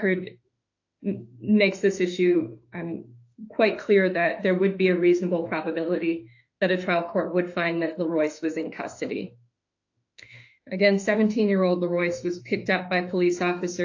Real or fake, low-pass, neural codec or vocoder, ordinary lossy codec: fake; 7.2 kHz; autoencoder, 48 kHz, 32 numbers a frame, DAC-VAE, trained on Japanese speech; AAC, 48 kbps